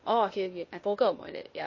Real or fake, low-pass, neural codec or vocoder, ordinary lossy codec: fake; 7.2 kHz; codec, 16 kHz, 0.8 kbps, ZipCodec; MP3, 32 kbps